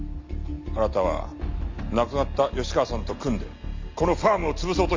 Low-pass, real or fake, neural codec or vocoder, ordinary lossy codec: 7.2 kHz; real; none; MP3, 48 kbps